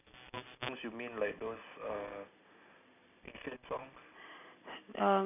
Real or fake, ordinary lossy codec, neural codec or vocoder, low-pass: real; none; none; 3.6 kHz